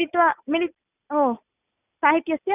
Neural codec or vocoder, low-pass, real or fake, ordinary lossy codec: none; 3.6 kHz; real; none